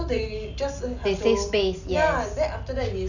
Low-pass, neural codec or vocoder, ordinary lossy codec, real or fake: 7.2 kHz; none; none; real